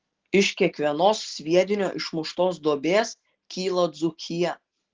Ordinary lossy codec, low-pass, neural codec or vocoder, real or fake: Opus, 16 kbps; 7.2 kHz; none; real